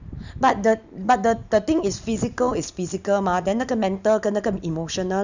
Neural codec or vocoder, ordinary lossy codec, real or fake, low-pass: none; none; real; 7.2 kHz